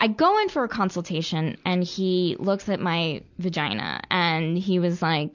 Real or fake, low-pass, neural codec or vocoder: real; 7.2 kHz; none